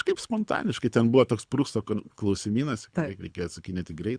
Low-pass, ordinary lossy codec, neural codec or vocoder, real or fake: 9.9 kHz; Opus, 64 kbps; codec, 24 kHz, 6 kbps, HILCodec; fake